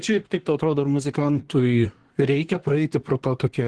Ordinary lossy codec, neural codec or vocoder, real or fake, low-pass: Opus, 16 kbps; codec, 24 kHz, 1 kbps, SNAC; fake; 10.8 kHz